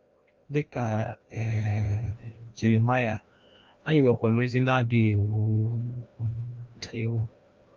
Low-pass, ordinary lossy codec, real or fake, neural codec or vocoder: 7.2 kHz; Opus, 32 kbps; fake; codec, 16 kHz, 1 kbps, FreqCodec, larger model